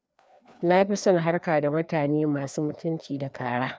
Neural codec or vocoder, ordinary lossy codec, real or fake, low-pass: codec, 16 kHz, 2 kbps, FreqCodec, larger model; none; fake; none